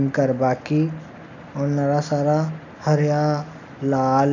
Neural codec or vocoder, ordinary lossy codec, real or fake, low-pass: none; none; real; 7.2 kHz